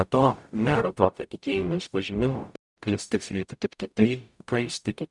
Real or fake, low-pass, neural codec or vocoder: fake; 10.8 kHz; codec, 44.1 kHz, 0.9 kbps, DAC